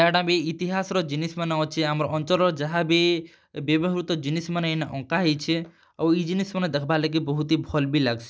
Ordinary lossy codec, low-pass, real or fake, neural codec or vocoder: none; none; real; none